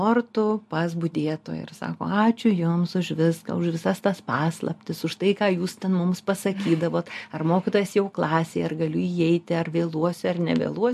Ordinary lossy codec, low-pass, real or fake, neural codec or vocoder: MP3, 64 kbps; 14.4 kHz; real; none